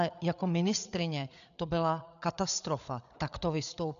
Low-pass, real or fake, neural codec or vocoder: 7.2 kHz; fake; codec, 16 kHz, 8 kbps, FreqCodec, larger model